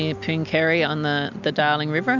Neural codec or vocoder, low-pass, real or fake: none; 7.2 kHz; real